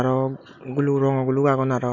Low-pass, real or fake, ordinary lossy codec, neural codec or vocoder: 7.2 kHz; real; none; none